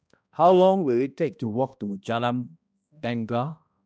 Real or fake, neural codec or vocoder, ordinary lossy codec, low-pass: fake; codec, 16 kHz, 1 kbps, X-Codec, HuBERT features, trained on balanced general audio; none; none